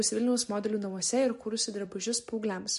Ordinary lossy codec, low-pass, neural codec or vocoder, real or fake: MP3, 48 kbps; 14.4 kHz; none; real